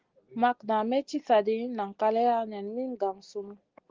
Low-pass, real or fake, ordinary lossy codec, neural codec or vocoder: 7.2 kHz; real; Opus, 16 kbps; none